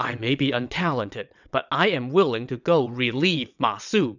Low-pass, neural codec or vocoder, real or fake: 7.2 kHz; vocoder, 22.05 kHz, 80 mel bands, WaveNeXt; fake